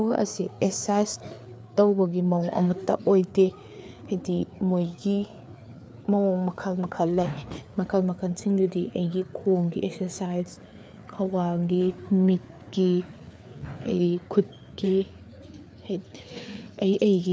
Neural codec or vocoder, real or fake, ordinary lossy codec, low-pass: codec, 16 kHz, 4 kbps, FreqCodec, larger model; fake; none; none